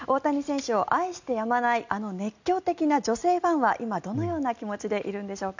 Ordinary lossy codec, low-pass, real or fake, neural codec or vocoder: none; 7.2 kHz; real; none